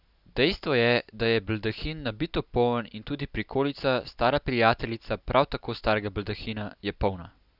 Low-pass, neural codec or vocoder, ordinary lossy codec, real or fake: 5.4 kHz; none; none; real